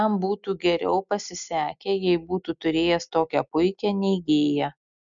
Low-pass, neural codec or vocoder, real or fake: 7.2 kHz; none; real